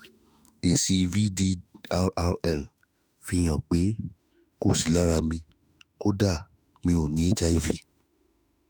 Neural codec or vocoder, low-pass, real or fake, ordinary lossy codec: autoencoder, 48 kHz, 32 numbers a frame, DAC-VAE, trained on Japanese speech; none; fake; none